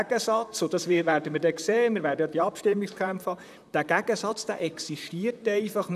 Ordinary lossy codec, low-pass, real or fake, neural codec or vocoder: none; 14.4 kHz; fake; vocoder, 44.1 kHz, 128 mel bands, Pupu-Vocoder